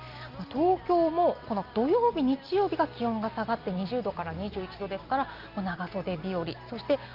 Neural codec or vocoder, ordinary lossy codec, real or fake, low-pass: none; Opus, 24 kbps; real; 5.4 kHz